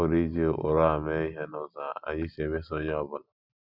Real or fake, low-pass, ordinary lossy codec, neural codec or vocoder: real; 5.4 kHz; Opus, 64 kbps; none